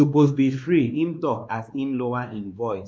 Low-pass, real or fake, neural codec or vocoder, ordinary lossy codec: none; fake; codec, 16 kHz, 2 kbps, X-Codec, WavLM features, trained on Multilingual LibriSpeech; none